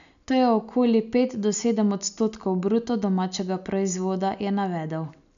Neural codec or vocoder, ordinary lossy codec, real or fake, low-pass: none; none; real; 7.2 kHz